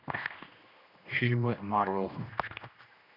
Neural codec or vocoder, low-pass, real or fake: codec, 16 kHz, 1 kbps, X-Codec, HuBERT features, trained on general audio; 5.4 kHz; fake